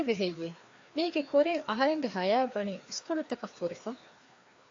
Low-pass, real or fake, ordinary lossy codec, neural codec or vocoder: 7.2 kHz; fake; AAC, 48 kbps; codec, 16 kHz, 2 kbps, FreqCodec, larger model